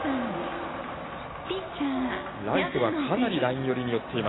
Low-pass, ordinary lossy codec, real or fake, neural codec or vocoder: 7.2 kHz; AAC, 16 kbps; real; none